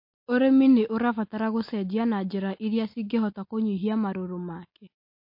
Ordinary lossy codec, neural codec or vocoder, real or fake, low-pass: MP3, 32 kbps; none; real; 5.4 kHz